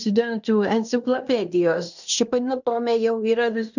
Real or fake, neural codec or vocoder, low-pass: fake; codec, 16 kHz in and 24 kHz out, 0.9 kbps, LongCat-Audio-Codec, fine tuned four codebook decoder; 7.2 kHz